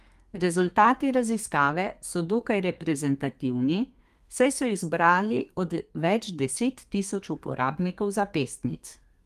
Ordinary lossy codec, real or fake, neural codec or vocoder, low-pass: Opus, 32 kbps; fake; codec, 32 kHz, 1.9 kbps, SNAC; 14.4 kHz